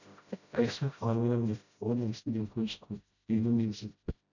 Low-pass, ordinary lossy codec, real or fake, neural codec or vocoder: 7.2 kHz; none; fake; codec, 16 kHz, 0.5 kbps, FreqCodec, smaller model